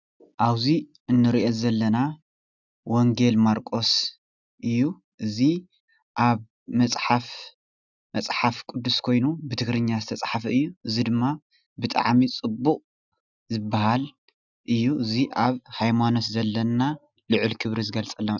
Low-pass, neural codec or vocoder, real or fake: 7.2 kHz; none; real